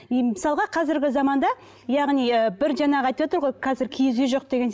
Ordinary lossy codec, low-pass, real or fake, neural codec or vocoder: none; none; real; none